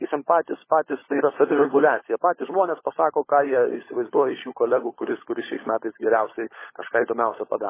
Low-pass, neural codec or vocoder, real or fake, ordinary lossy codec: 3.6 kHz; codec, 16 kHz, 16 kbps, FunCodec, trained on LibriTTS, 50 frames a second; fake; MP3, 16 kbps